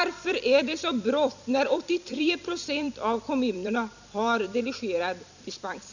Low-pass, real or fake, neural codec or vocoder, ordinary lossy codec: 7.2 kHz; fake; vocoder, 44.1 kHz, 128 mel bands every 256 samples, BigVGAN v2; none